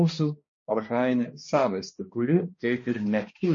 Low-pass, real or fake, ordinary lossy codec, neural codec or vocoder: 7.2 kHz; fake; MP3, 32 kbps; codec, 16 kHz, 1 kbps, X-Codec, HuBERT features, trained on balanced general audio